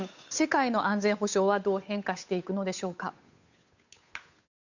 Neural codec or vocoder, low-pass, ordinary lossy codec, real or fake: codec, 16 kHz, 8 kbps, FunCodec, trained on Chinese and English, 25 frames a second; 7.2 kHz; none; fake